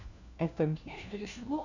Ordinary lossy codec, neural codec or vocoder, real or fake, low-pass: none; codec, 16 kHz, 1 kbps, FunCodec, trained on LibriTTS, 50 frames a second; fake; 7.2 kHz